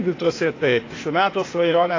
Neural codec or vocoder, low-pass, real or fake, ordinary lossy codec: codec, 16 kHz, 0.8 kbps, ZipCodec; 7.2 kHz; fake; AAC, 32 kbps